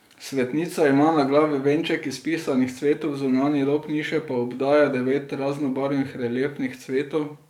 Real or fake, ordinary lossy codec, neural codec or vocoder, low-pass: fake; none; codec, 44.1 kHz, 7.8 kbps, DAC; 19.8 kHz